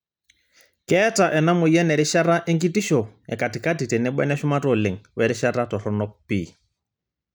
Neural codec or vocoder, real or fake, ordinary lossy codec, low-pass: none; real; none; none